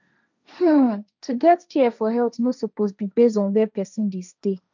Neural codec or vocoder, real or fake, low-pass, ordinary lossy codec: codec, 16 kHz, 1.1 kbps, Voila-Tokenizer; fake; 7.2 kHz; none